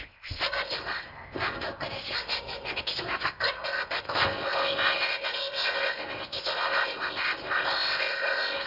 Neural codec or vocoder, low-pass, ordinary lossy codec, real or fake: codec, 16 kHz in and 24 kHz out, 0.6 kbps, FocalCodec, streaming, 4096 codes; 5.4 kHz; none; fake